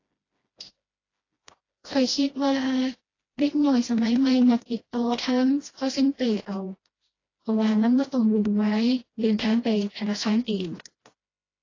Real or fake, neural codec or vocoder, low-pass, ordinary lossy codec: fake; codec, 16 kHz, 1 kbps, FreqCodec, smaller model; 7.2 kHz; AAC, 32 kbps